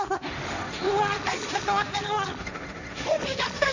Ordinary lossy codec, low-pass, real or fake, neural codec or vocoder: none; 7.2 kHz; fake; codec, 16 kHz, 1.1 kbps, Voila-Tokenizer